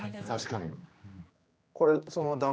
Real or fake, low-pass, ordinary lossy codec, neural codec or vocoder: fake; none; none; codec, 16 kHz, 2 kbps, X-Codec, HuBERT features, trained on general audio